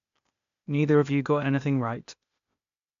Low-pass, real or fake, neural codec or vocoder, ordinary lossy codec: 7.2 kHz; fake; codec, 16 kHz, 0.8 kbps, ZipCodec; AAC, 96 kbps